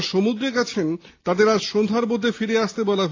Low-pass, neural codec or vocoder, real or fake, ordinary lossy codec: 7.2 kHz; none; real; AAC, 32 kbps